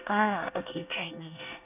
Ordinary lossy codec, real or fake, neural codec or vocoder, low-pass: none; fake; codec, 24 kHz, 1 kbps, SNAC; 3.6 kHz